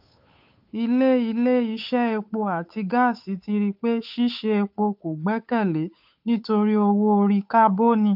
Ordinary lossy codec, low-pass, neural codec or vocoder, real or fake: AAC, 48 kbps; 5.4 kHz; codec, 16 kHz, 8 kbps, FunCodec, trained on Chinese and English, 25 frames a second; fake